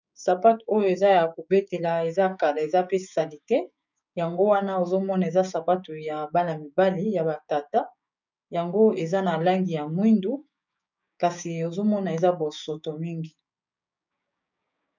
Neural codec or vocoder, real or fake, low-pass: codec, 16 kHz, 6 kbps, DAC; fake; 7.2 kHz